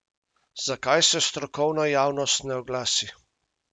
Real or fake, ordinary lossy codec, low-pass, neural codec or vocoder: real; none; 9.9 kHz; none